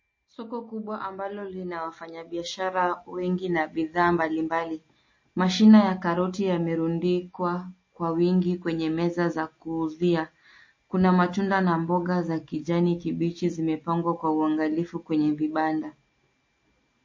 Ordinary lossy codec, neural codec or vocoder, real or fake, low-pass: MP3, 32 kbps; none; real; 7.2 kHz